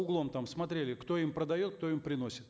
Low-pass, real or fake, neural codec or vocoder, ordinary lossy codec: none; real; none; none